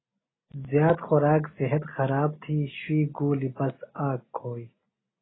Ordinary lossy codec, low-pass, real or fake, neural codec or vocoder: AAC, 16 kbps; 7.2 kHz; real; none